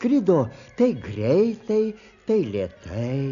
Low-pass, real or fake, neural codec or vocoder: 7.2 kHz; real; none